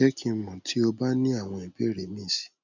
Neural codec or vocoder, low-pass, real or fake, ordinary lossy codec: none; 7.2 kHz; real; none